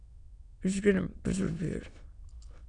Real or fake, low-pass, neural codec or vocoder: fake; 9.9 kHz; autoencoder, 22.05 kHz, a latent of 192 numbers a frame, VITS, trained on many speakers